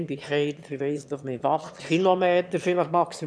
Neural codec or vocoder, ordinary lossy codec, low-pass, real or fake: autoencoder, 22.05 kHz, a latent of 192 numbers a frame, VITS, trained on one speaker; none; none; fake